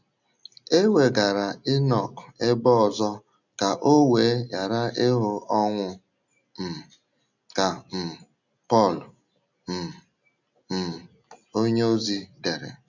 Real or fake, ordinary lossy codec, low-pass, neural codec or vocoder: real; none; 7.2 kHz; none